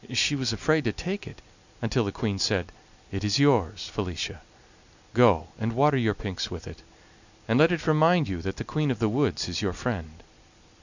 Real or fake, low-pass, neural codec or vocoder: real; 7.2 kHz; none